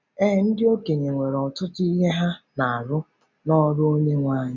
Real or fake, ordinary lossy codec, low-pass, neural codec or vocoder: real; none; 7.2 kHz; none